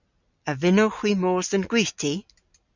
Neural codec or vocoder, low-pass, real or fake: none; 7.2 kHz; real